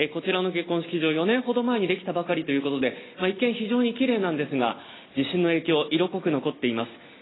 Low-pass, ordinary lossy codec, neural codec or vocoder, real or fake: 7.2 kHz; AAC, 16 kbps; none; real